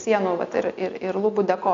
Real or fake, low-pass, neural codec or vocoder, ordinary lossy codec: real; 7.2 kHz; none; MP3, 48 kbps